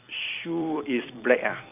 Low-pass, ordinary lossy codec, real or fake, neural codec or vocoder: 3.6 kHz; none; fake; vocoder, 44.1 kHz, 128 mel bands every 256 samples, BigVGAN v2